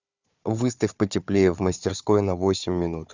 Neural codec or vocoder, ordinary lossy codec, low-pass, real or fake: codec, 16 kHz, 4 kbps, FunCodec, trained on Chinese and English, 50 frames a second; Opus, 64 kbps; 7.2 kHz; fake